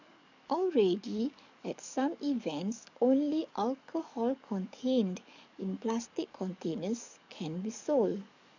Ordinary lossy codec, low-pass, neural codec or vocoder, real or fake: none; 7.2 kHz; codec, 44.1 kHz, 7.8 kbps, DAC; fake